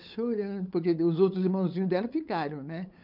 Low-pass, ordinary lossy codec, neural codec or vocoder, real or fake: 5.4 kHz; none; codec, 16 kHz, 8 kbps, FunCodec, trained on LibriTTS, 25 frames a second; fake